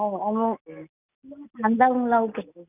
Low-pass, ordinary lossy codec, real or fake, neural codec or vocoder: 3.6 kHz; none; real; none